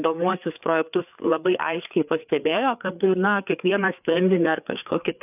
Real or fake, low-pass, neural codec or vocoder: fake; 3.6 kHz; codec, 16 kHz, 4 kbps, FreqCodec, larger model